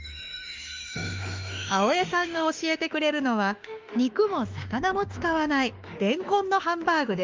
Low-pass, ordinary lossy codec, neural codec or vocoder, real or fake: 7.2 kHz; Opus, 32 kbps; autoencoder, 48 kHz, 32 numbers a frame, DAC-VAE, trained on Japanese speech; fake